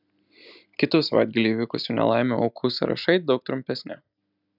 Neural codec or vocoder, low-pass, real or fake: none; 5.4 kHz; real